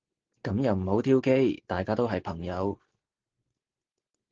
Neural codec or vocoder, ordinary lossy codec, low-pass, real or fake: none; Opus, 16 kbps; 7.2 kHz; real